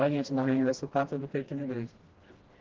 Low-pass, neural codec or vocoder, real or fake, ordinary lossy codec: 7.2 kHz; codec, 16 kHz, 1 kbps, FreqCodec, smaller model; fake; Opus, 16 kbps